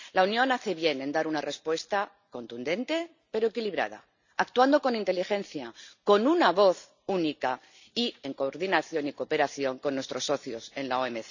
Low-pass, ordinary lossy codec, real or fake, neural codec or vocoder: 7.2 kHz; none; real; none